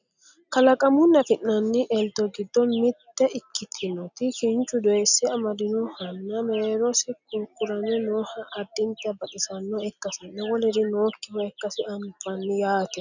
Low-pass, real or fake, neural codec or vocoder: 7.2 kHz; real; none